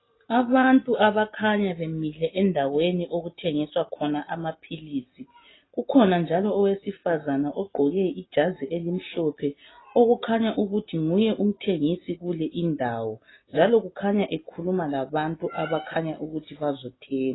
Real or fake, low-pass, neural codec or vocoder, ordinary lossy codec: real; 7.2 kHz; none; AAC, 16 kbps